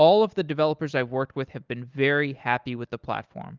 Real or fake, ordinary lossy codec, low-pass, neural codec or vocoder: real; Opus, 32 kbps; 7.2 kHz; none